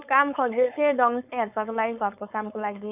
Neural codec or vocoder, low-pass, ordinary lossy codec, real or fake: codec, 16 kHz, 4.8 kbps, FACodec; 3.6 kHz; none; fake